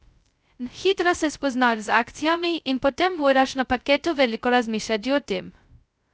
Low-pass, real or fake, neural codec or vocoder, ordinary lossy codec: none; fake; codec, 16 kHz, 0.2 kbps, FocalCodec; none